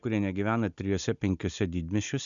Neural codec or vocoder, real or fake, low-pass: none; real; 7.2 kHz